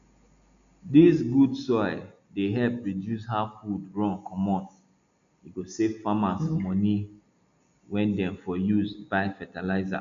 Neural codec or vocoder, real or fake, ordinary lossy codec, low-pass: none; real; none; 7.2 kHz